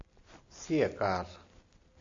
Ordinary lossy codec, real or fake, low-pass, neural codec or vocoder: AAC, 64 kbps; real; 7.2 kHz; none